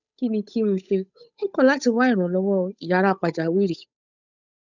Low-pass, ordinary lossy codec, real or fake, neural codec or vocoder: 7.2 kHz; none; fake; codec, 16 kHz, 8 kbps, FunCodec, trained on Chinese and English, 25 frames a second